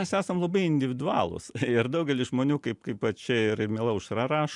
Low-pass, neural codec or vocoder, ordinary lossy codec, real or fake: 10.8 kHz; none; MP3, 96 kbps; real